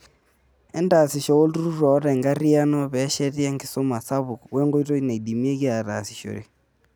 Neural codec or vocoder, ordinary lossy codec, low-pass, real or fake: none; none; none; real